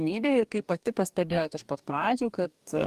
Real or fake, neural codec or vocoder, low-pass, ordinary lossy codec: fake; codec, 44.1 kHz, 2.6 kbps, DAC; 14.4 kHz; Opus, 32 kbps